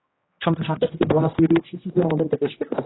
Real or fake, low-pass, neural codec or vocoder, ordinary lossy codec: fake; 7.2 kHz; codec, 16 kHz, 1 kbps, X-Codec, HuBERT features, trained on general audio; AAC, 16 kbps